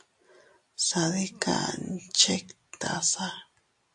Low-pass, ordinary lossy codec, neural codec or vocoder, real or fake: 10.8 kHz; AAC, 64 kbps; none; real